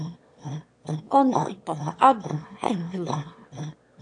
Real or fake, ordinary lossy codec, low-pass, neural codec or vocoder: fake; AAC, 64 kbps; 9.9 kHz; autoencoder, 22.05 kHz, a latent of 192 numbers a frame, VITS, trained on one speaker